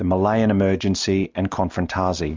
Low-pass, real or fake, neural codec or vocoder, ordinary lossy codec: 7.2 kHz; real; none; MP3, 64 kbps